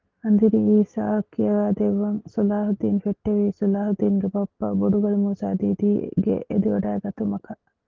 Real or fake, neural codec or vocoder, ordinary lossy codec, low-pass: real; none; Opus, 16 kbps; 7.2 kHz